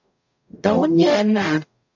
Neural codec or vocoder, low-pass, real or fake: codec, 44.1 kHz, 0.9 kbps, DAC; 7.2 kHz; fake